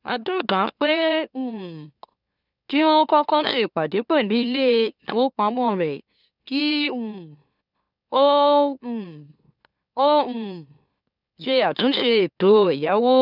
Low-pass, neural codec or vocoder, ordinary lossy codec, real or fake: 5.4 kHz; autoencoder, 44.1 kHz, a latent of 192 numbers a frame, MeloTTS; none; fake